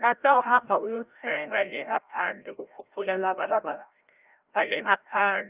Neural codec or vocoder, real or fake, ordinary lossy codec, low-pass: codec, 16 kHz, 0.5 kbps, FreqCodec, larger model; fake; Opus, 24 kbps; 3.6 kHz